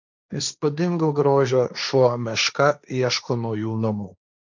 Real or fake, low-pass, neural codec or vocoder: fake; 7.2 kHz; codec, 16 kHz, 1.1 kbps, Voila-Tokenizer